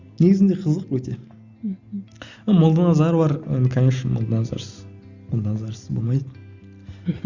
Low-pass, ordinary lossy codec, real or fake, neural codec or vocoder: 7.2 kHz; Opus, 64 kbps; real; none